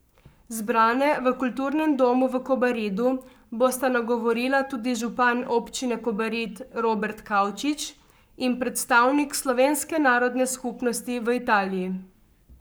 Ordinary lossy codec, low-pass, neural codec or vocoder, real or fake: none; none; codec, 44.1 kHz, 7.8 kbps, Pupu-Codec; fake